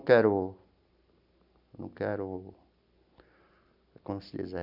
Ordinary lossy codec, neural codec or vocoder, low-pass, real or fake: none; none; 5.4 kHz; real